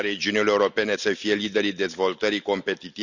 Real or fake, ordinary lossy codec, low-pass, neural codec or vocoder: real; none; 7.2 kHz; none